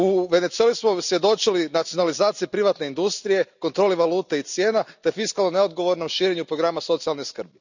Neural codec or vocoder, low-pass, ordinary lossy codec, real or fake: none; 7.2 kHz; none; real